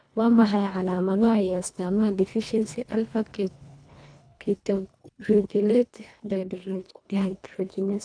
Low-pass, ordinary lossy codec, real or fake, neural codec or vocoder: 9.9 kHz; none; fake; codec, 24 kHz, 1.5 kbps, HILCodec